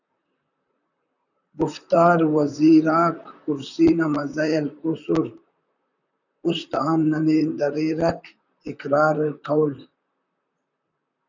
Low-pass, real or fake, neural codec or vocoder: 7.2 kHz; fake; vocoder, 44.1 kHz, 128 mel bands, Pupu-Vocoder